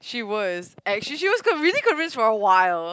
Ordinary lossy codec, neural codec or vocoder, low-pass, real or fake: none; none; none; real